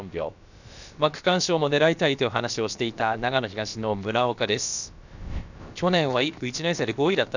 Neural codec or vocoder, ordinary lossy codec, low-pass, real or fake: codec, 16 kHz, about 1 kbps, DyCAST, with the encoder's durations; none; 7.2 kHz; fake